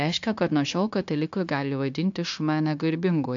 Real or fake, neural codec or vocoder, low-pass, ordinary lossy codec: fake; codec, 16 kHz, 0.9 kbps, LongCat-Audio-Codec; 7.2 kHz; AAC, 64 kbps